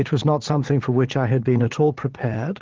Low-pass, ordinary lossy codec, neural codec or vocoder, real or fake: 7.2 kHz; Opus, 16 kbps; vocoder, 22.05 kHz, 80 mel bands, Vocos; fake